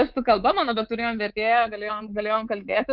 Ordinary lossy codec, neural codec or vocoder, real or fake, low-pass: Opus, 32 kbps; codec, 24 kHz, 3.1 kbps, DualCodec; fake; 5.4 kHz